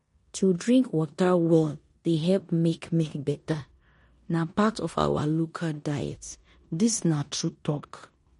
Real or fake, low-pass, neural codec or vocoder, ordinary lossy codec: fake; 10.8 kHz; codec, 16 kHz in and 24 kHz out, 0.9 kbps, LongCat-Audio-Codec, four codebook decoder; MP3, 48 kbps